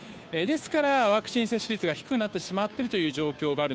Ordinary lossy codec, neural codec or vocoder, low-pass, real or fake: none; codec, 16 kHz, 2 kbps, FunCodec, trained on Chinese and English, 25 frames a second; none; fake